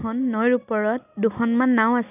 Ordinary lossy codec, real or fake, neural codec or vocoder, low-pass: none; real; none; 3.6 kHz